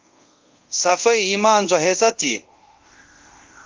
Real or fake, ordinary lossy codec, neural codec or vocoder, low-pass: fake; Opus, 24 kbps; codec, 24 kHz, 0.5 kbps, DualCodec; 7.2 kHz